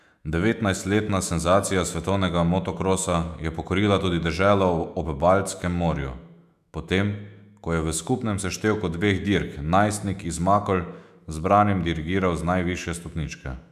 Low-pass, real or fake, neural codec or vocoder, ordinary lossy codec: 14.4 kHz; fake; autoencoder, 48 kHz, 128 numbers a frame, DAC-VAE, trained on Japanese speech; none